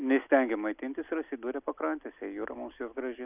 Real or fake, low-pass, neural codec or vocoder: real; 3.6 kHz; none